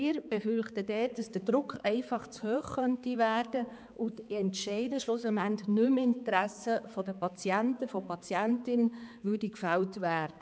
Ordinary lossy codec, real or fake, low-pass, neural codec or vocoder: none; fake; none; codec, 16 kHz, 4 kbps, X-Codec, HuBERT features, trained on balanced general audio